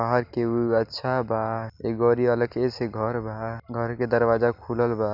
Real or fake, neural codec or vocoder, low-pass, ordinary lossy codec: real; none; 5.4 kHz; none